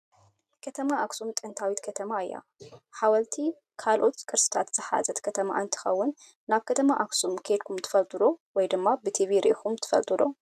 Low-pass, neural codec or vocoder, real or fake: 14.4 kHz; none; real